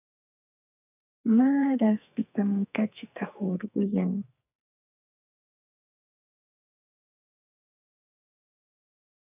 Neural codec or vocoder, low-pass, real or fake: codec, 44.1 kHz, 2.6 kbps, SNAC; 3.6 kHz; fake